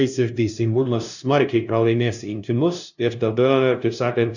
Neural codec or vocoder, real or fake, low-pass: codec, 16 kHz, 0.5 kbps, FunCodec, trained on LibriTTS, 25 frames a second; fake; 7.2 kHz